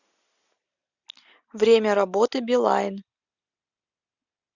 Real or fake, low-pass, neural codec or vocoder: real; 7.2 kHz; none